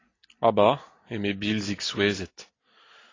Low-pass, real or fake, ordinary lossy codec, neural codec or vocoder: 7.2 kHz; real; AAC, 32 kbps; none